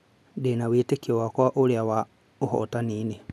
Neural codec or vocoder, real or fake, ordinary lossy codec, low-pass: none; real; none; none